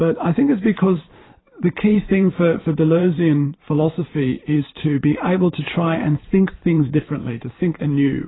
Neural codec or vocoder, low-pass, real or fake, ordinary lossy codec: vocoder, 44.1 kHz, 128 mel bands every 512 samples, BigVGAN v2; 7.2 kHz; fake; AAC, 16 kbps